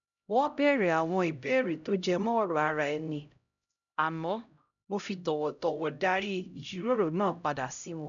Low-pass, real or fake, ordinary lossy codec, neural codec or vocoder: 7.2 kHz; fake; none; codec, 16 kHz, 0.5 kbps, X-Codec, HuBERT features, trained on LibriSpeech